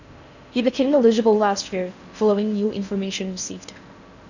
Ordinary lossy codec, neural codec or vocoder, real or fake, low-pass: none; codec, 16 kHz in and 24 kHz out, 0.6 kbps, FocalCodec, streaming, 4096 codes; fake; 7.2 kHz